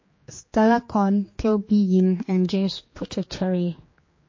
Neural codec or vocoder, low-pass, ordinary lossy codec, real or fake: codec, 16 kHz, 2 kbps, X-Codec, HuBERT features, trained on general audio; 7.2 kHz; MP3, 32 kbps; fake